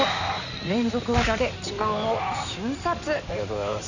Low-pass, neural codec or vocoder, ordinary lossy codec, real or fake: 7.2 kHz; codec, 16 kHz in and 24 kHz out, 2.2 kbps, FireRedTTS-2 codec; none; fake